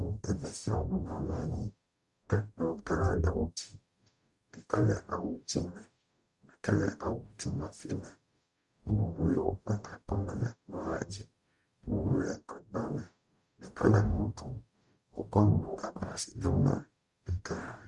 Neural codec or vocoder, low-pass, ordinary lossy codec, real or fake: codec, 44.1 kHz, 0.9 kbps, DAC; 10.8 kHz; Opus, 64 kbps; fake